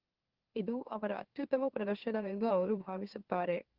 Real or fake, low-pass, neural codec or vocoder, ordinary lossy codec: fake; 5.4 kHz; autoencoder, 44.1 kHz, a latent of 192 numbers a frame, MeloTTS; Opus, 16 kbps